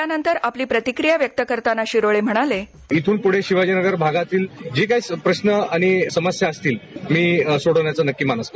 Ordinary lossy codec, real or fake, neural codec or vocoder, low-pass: none; real; none; none